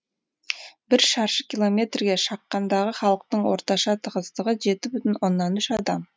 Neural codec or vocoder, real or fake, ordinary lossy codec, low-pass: none; real; none; none